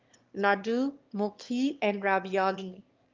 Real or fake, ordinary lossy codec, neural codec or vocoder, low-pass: fake; Opus, 24 kbps; autoencoder, 22.05 kHz, a latent of 192 numbers a frame, VITS, trained on one speaker; 7.2 kHz